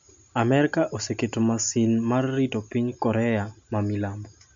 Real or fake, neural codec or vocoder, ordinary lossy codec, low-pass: real; none; MP3, 64 kbps; 7.2 kHz